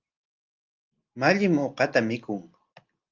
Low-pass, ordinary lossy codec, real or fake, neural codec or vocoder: 7.2 kHz; Opus, 32 kbps; real; none